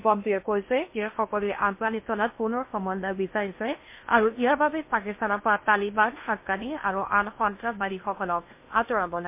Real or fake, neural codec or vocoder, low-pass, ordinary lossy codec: fake; codec, 16 kHz in and 24 kHz out, 0.8 kbps, FocalCodec, streaming, 65536 codes; 3.6 kHz; MP3, 24 kbps